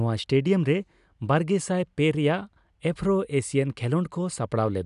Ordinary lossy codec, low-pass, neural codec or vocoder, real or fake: none; 10.8 kHz; none; real